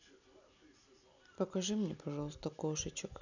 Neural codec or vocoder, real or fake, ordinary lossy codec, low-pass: none; real; none; 7.2 kHz